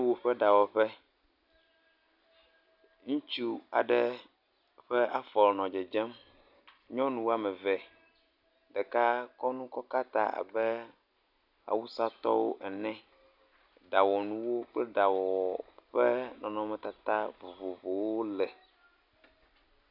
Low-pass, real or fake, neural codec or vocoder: 5.4 kHz; real; none